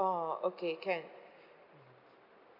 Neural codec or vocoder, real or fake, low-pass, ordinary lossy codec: none; real; 5.4 kHz; none